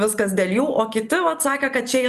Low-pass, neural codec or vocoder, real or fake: 14.4 kHz; none; real